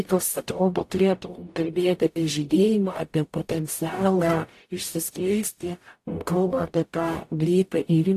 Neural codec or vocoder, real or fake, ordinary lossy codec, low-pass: codec, 44.1 kHz, 0.9 kbps, DAC; fake; AAC, 64 kbps; 14.4 kHz